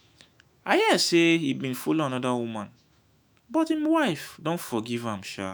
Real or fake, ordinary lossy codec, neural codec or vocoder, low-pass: fake; none; autoencoder, 48 kHz, 128 numbers a frame, DAC-VAE, trained on Japanese speech; none